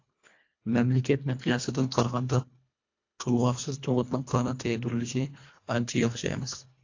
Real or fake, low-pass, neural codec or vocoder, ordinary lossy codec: fake; 7.2 kHz; codec, 24 kHz, 1.5 kbps, HILCodec; AAC, 48 kbps